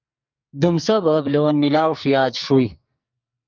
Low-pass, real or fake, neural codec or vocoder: 7.2 kHz; fake; codec, 44.1 kHz, 2.6 kbps, SNAC